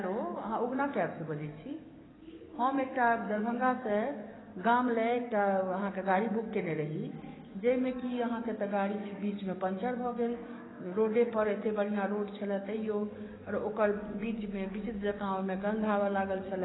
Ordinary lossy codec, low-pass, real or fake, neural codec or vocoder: AAC, 16 kbps; 7.2 kHz; fake; codec, 44.1 kHz, 7.8 kbps, DAC